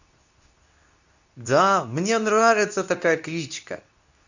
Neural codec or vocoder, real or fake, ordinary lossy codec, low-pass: codec, 24 kHz, 0.9 kbps, WavTokenizer, medium speech release version 2; fake; none; 7.2 kHz